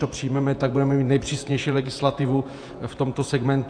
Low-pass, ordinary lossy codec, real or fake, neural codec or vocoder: 9.9 kHz; AAC, 64 kbps; fake; vocoder, 48 kHz, 128 mel bands, Vocos